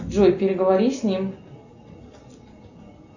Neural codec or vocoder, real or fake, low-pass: none; real; 7.2 kHz